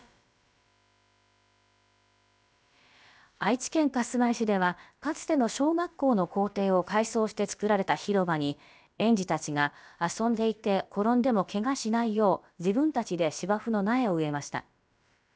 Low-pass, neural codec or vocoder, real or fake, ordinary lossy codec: none; codec, 16 kHz, about 1 kbps, DyCAST, with the encoder's durations; fake; none